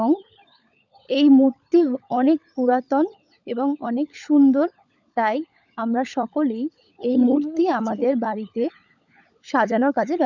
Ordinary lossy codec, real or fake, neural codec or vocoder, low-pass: none; fake; codec, 16 kHz, 16 kbps, FunCodec, trained on LibriTTS, 50 frames a second; 7.2 kHz